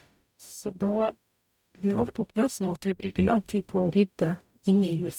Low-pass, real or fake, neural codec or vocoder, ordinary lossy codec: 19.8 kHz; fake; codec, 44.1 kHz, 0.9 kbps, DAC; none